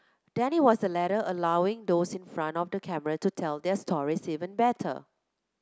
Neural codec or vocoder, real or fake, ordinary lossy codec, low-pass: none; real; none; none